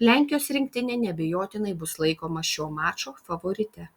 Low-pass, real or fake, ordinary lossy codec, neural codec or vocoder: 19.8 kHz; real; Opus, 64 kbps; none